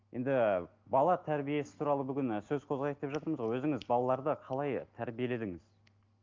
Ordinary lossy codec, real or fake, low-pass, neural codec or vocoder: Opus, 32 kbps; real; 7.2 kHz; none